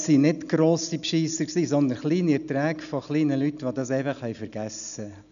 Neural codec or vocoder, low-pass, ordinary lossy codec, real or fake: none; 7.2 kHz; none; real